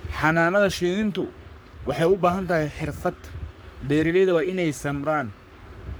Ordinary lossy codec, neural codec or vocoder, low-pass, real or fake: none; codec, 44.1 kHz, 3.4 kbps, Pupu-Codec; none; fake